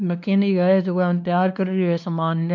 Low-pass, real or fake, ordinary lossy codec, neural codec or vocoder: 7.2 kHz; fake; none; codec, 16 kHz, 2 kbps, X-Codec, WavLM features, trained on Multilingual LibriSpeech